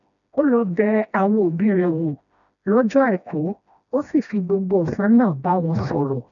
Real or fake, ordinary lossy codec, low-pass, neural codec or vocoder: fake; none; 7.2 kHz; codec, 16 kHz, 1 kbps, FreqCodec, smaller model